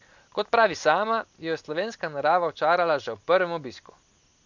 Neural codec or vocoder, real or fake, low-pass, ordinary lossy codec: none; real; 7.2 kHz; MP3, 64 kbps